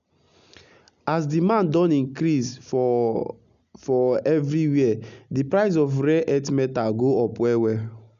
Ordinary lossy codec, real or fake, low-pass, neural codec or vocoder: none; real; 7.2 kHz; none